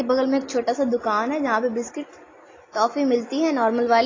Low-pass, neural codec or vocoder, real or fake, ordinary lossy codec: 7.2 kHz; none; real; AAC, 32 kbps